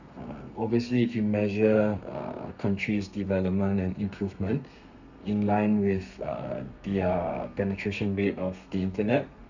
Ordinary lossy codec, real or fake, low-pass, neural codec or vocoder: none; fake; 7.2 kHz; codec, 32 kHz, 1.9 kbps, SNAC